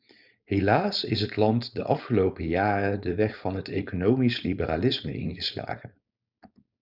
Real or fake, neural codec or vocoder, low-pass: fake; codec, 16 kHz, 4.8 kbps, FACodec; 5.4 kHz